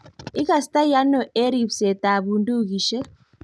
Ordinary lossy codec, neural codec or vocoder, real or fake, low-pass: none; none; real; none